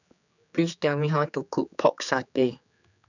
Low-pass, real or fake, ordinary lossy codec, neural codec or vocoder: 7.2 kHz; fake; none; codec, 16 kHz, 4 kbps, X-Codec, HuBERT features, trained on general audio